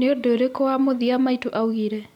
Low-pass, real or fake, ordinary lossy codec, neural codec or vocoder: 19.8 kHz; real; MP3, 96 kbps; none